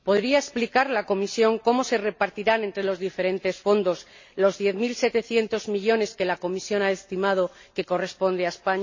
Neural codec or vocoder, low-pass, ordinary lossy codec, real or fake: none; 7.2 kHz; MP3, 32 kbps; real